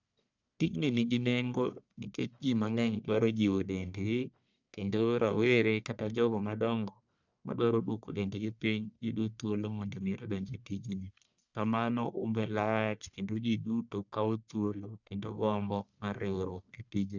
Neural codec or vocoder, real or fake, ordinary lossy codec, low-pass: codec, 44.1 kHz, 1.7 kbps, Pupu-Codec; fake; none; 7.2 kHz